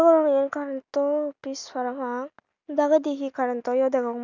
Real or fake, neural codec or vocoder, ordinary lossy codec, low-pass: real; none; none; 7.2 kHz